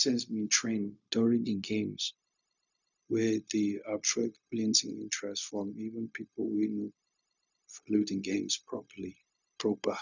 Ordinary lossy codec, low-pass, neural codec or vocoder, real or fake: none; 7.2 kHz; codec, 16 kHz, 0.4 kbps, LongCat-Audio-Codec; fake